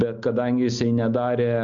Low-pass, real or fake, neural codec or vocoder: 7.2 kHz; real; none